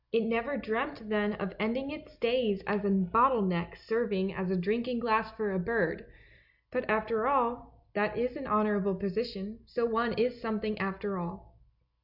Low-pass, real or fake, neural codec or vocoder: 5.4 kHz; real; none